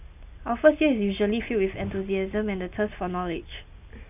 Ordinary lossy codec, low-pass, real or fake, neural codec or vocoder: none; 3.6 kHz; real; none